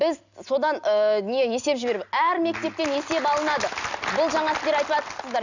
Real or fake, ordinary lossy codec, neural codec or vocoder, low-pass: real; none; none; 7.2 kHz